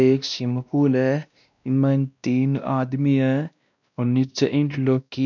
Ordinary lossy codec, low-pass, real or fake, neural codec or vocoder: none; 7.2 kHz; fake; codec, 16 kHz, 1 kbps, X-Codec, WavLM features, trained on Multilingual LibriSpeech